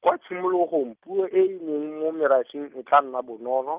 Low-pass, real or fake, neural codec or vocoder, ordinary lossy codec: 3.6 kHz; real; none; Opus, 24 kbps